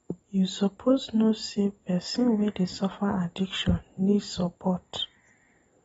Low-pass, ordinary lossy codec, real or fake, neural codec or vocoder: 19.8 kHz; AAC, 24 kbps; real; none